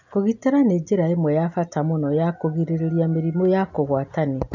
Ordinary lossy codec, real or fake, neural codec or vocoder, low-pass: none; real; none; 7.2 kHz